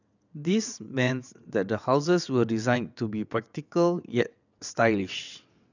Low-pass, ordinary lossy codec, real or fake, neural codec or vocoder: 7.2 kHz; none; fake; vocoder, 22.05 kHz, 80 mel bands, WaveNeXt